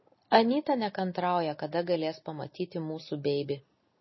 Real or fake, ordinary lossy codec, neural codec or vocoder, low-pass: real; MP3, 24 kbps; none; 7.2 kHz